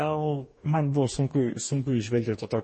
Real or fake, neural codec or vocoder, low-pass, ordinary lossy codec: fake; codec, 44.1 kHz, 2.6 kbps, DAC; 10.8 kHz; MP3, 32 kbps